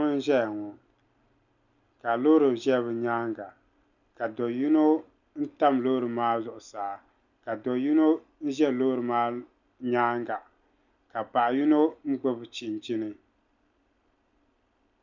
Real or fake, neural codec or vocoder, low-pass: real; none; 7.2 kHz